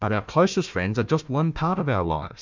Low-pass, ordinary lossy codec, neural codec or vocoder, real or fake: 7.2 kHz; MP3, 64 kbps; codec, 16 kHz, 1 kbps, FunCodec, trained on Chinese and English, 50 frames a second; fake